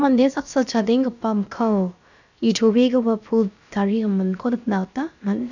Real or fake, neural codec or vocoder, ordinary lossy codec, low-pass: fake; codec, 16 kHz, about 1 kbps, DyCAST, with the encoder's durations; none; 7.2 kHz